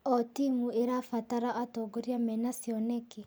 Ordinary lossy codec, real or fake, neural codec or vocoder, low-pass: none; real; none; none